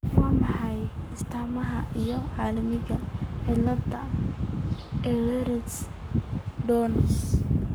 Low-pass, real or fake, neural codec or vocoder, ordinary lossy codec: none; fake; codec, 44.1 kHz, 7.8 kbps, Pupu-Codec; none